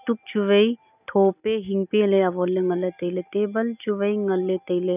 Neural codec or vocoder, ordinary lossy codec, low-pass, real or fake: none; none; 3.6 kHz; real